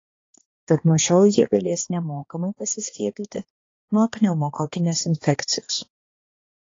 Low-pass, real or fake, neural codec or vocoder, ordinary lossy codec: 7.2 kHz; fake; codec, 16 kHz, 2 kbps, X-Codec, HuBERT features, trained on balanced general audio; AAC, 32 kbps